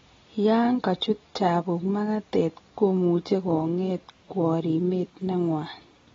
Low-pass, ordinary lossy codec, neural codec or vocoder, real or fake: 7.2 kHz; AAC, 24 kbps; none; real